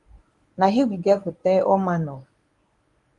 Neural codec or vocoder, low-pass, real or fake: codec, 24 kHz, 0.9 kbps, WavTokenizer, medium speech release version 1; 10.8 kHz; fake